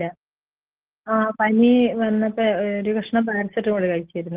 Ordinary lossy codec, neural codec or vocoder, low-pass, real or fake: Opus, 16 kbps; none; 3.6 kHz; real